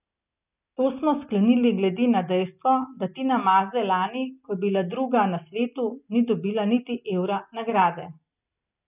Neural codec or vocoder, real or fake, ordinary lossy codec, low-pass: none; real; none; 3.6 kHz